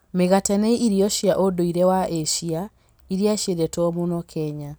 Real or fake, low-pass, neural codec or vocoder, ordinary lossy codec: real; none; none; none